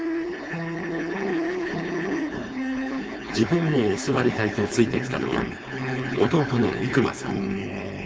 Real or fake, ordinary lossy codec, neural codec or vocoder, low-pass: fake; none; codec, 16 kHz, 4.8 kbps, FACodec; none